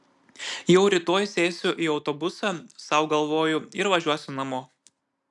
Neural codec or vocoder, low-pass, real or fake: none; 10.8 kHz; real